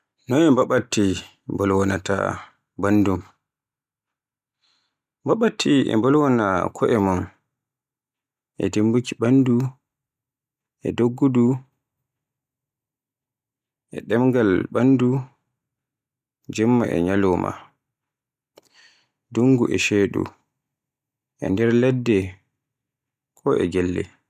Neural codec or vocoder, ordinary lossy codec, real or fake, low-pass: none; none; real; 14.4 kHz